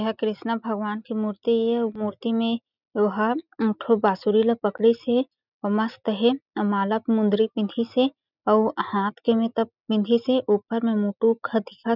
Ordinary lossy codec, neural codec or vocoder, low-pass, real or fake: none; none; 5.4 kHz; real